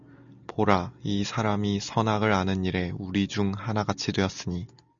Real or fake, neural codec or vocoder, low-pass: real; none; 7.2 kHz